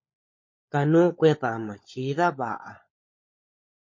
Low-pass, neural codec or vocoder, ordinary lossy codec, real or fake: 7.2 kHz; codec, 16 kHz, 16 kbps, FunCodec, trained on LibriTTS, 50 frames a second; MP3, 32 kbps; fake